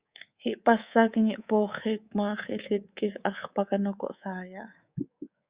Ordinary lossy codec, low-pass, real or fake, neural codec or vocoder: Opus, 64 kbps; 3.6 kHz; fake; codec, 24 kHz, 3.1 kbps, DualCodec